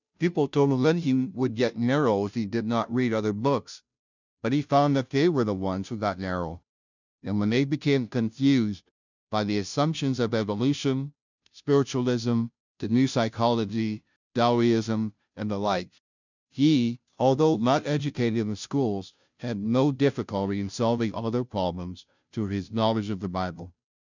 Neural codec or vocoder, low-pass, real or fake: codec, 16 kHz, 0.5 kbps, FunCodec, trained on Chinese and English, 25 frames a second; 7.2 kHz; fake